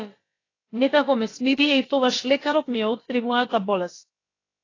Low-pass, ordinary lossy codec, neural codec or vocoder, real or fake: 7.2 kHz; AAC, 32 kbps; codec, 16 kHz, about 1 kbps, DyCAST, with the encoder's durations; fake